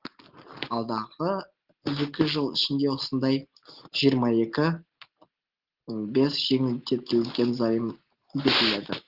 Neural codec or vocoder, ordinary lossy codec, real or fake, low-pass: none; Opus, 16 kbps; real; 5.4 kHz